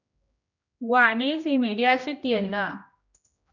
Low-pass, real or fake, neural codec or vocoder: 7.2 kHz; fake; codec, 16 kHz, 1 kbps, X-Codec, HuBERT features, trained on general audio